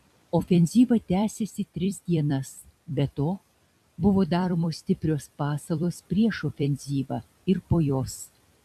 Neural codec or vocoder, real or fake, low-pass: vocoder, 44.1 kHz, 128 mel bands every 256 samples, BigVGAN v2; fake; 14.4 kHz